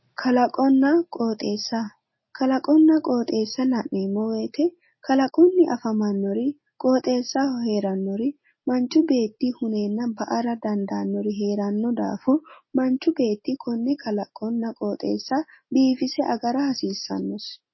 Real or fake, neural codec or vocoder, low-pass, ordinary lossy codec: real; none; 7.2 kHz; MP3, 24 kbps